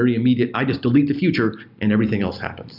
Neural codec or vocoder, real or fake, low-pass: none; real; 5.4 kHz